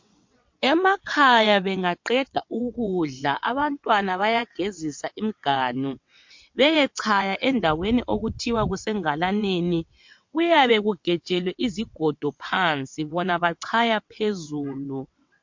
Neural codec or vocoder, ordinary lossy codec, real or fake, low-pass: vocoder, 22.05 kHz, 80 mel bands, WaveNeXt; MP3, 48 kbps; fake; 7.2 kHz